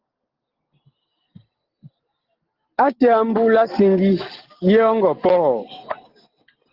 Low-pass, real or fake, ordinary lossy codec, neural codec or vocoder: 5.4 kHz; real; Opus, 16 kbps; none